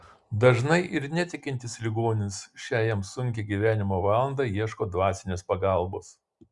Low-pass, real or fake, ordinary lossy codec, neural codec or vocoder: 10.8 kHz; real; Opus, 64 kbps; none